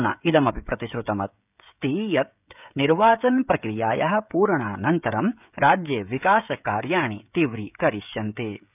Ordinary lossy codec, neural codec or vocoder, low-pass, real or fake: none; codec, 16 kHz, 16 kbps, FreqCodec, smaller model; 3.6 kHz; fake